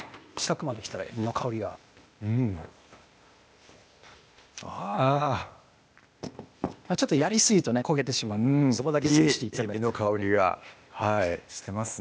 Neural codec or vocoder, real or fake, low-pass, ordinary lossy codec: codec, 16 kHz, 0.8 kbps, ZipCodec; fake; none; none